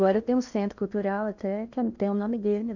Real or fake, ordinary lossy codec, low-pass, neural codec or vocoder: fake; none; 7.2 kHz; codec, 16 kHz in and 24 kHz out, 0.8 kbps, FocalCodec, streaming, 65536 codes